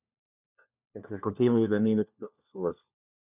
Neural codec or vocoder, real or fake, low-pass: codec, 16 kHz, 1 kbps, FunCodec, trained on LibriTTS, 50 frames a second; fake; 3.6 kHz